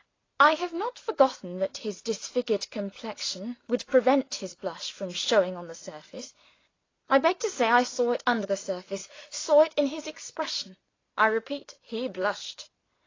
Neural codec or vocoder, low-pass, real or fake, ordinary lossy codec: none; 7.2 kHz; real; AAC, 32 kbps